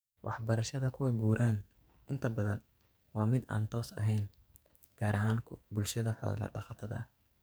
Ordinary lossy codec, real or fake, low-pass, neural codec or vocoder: none; fake; none; codec, 44.1 kHz, 2.6 kbps, SNAC